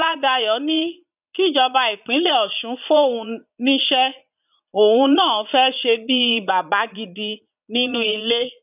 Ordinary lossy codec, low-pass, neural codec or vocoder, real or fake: none; 3.6 kHz; vocoder, 22.05 kHz, 80 mel bands, Vocos; fake